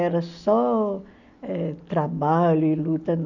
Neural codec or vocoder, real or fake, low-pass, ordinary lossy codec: none; real; 7.2 kHz; none